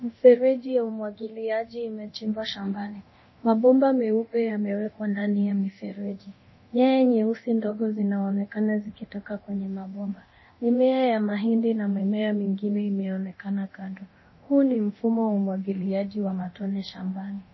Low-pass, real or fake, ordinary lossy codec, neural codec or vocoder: 7.2 kHz; fake; MP3, 24 kbps; codec, 24 kHz, 0.9 kbps, DualCodec